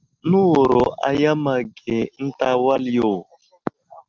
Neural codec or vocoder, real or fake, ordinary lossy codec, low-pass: none; real; Opus, 24 kbps; 7.2 kHz